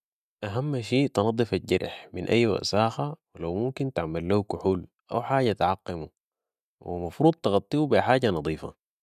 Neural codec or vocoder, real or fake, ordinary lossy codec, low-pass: none; real; none; 14.4 kHz